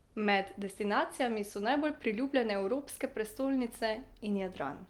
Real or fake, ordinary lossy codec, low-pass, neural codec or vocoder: real; Opus, 24 kbps; 19.8 kHz; none